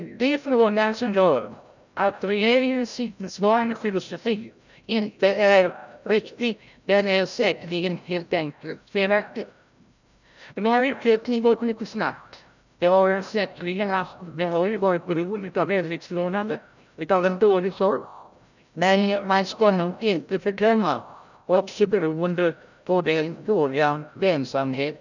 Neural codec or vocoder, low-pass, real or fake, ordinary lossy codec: codec, 16 kHz, 0.5 kbps, FreqCodec, larger model; 7.2 kHz; fake; none